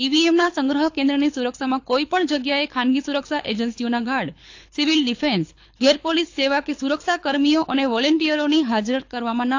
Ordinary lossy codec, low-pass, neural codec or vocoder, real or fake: AAC, 48 kbps; 7.2 kHz; codec, 24 kHz, 6 kbps, HILCodec; fake